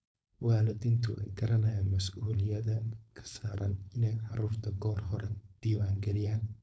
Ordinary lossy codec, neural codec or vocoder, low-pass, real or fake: none; codec, 16 kHz, 4.8 kbps, FACodec; none; fake